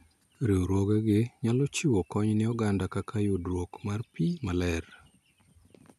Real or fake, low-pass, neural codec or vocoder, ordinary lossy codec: real; 14.4 kHz; none; none